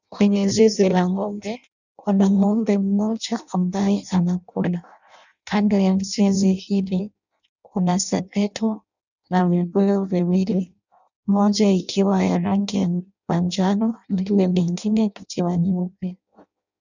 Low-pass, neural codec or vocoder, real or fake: 7.2 kHz; codec, 16 kHz in and 24 kHz out, 0.6 kbps, FireRedTTS-2 codec; fake